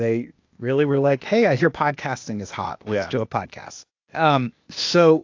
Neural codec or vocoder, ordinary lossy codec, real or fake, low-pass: codec, 16 kHz, 0.8 kbps, ZipCodec; AAC, 48 kbps; fake; 7.2 kHz